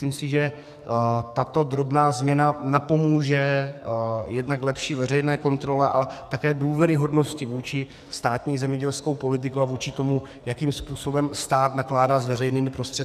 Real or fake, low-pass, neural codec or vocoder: fake; 14.4 kHz; codec, 44.1 kHz, 2.6 kbps, SNAC